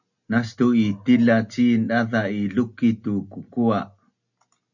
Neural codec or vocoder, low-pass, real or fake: none; 7.2 kHz; real